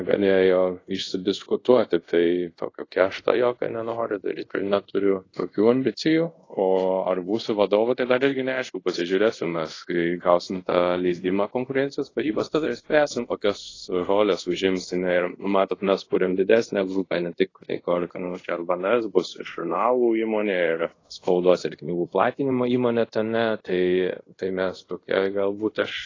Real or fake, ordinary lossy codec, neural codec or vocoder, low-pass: fake; AAC, 32 kbps; codec, 24 kHz, 0.5 kbps, DualCodec; 7.2 kHz